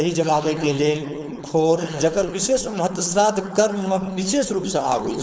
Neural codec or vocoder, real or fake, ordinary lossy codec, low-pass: codec, 16 kHz, 4.8 kbps, FACodec; fake; none; none